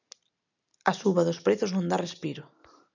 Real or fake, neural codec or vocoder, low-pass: real; none; 7.2 kHz